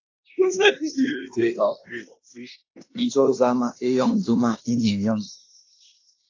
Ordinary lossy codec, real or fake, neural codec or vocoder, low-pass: AAC, 48 kbps; fake; codec, 16 kHz in and 24 kHz out, 0.9 kbps, LongCat-Audio-Codec, fine tuned four codebook decoder; 7.2 kHz